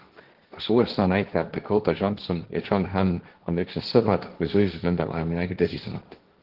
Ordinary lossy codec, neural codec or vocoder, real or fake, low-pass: Opus, 16 kbps; codec, 16 kHz, 1.1 kbps, Voila-Tokenizer; fake; 5.4 kHz